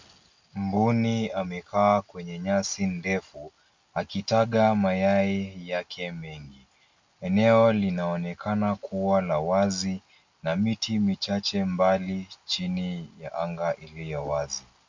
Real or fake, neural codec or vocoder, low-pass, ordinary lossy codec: real; none; 7.2 kHz; MP3, 64 kbps